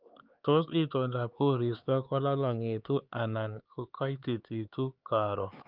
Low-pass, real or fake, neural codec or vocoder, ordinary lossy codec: 5.4 kHz; fake; codec, 16 kHz, 4 kbps, X-Codec, HuBERT features, trained on LibriSpeech; Opus, 24 kbps